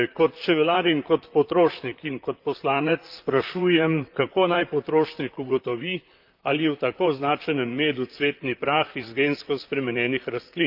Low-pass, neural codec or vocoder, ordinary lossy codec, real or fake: 5.4 kHz; vocoder, 44.1 kHz, 128 mel bands, Pupu-Vocoder; Opus, 32 kbps; fake